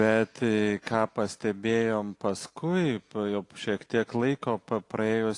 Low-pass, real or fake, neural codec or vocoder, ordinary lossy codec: 10.8 kHz; real; none; AAC, 48 kbps